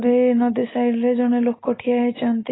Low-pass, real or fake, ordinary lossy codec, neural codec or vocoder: 7.2 kHz; real; AAC, 16 kbps; none